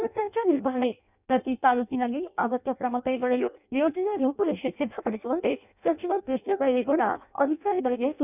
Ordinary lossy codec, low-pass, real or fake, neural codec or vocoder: none; 3.6 kHz; fake; codec, 16 kHz in and 24 kHz out, 0.6 kbps, FireRedTTS-2 codec